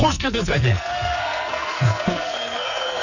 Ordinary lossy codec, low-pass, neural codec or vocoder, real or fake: none; 7.2 kHz; codec, 44.1 kHz, 2.6 kbps, SNAC; fake